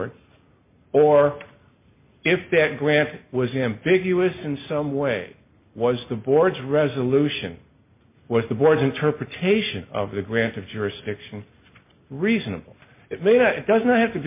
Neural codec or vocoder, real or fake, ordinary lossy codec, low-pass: none; real; MP3, 32 kbps; 3.6 kHz